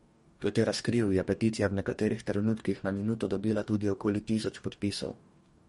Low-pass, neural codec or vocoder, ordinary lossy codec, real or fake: 19.8 kHz; codec, 44.1 kHz, 2.6 kbps, DAC; MP3, 48 kbps; fake